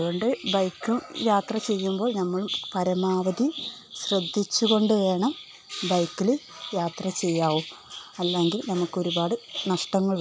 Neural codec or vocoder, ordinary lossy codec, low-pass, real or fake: none; none; none; real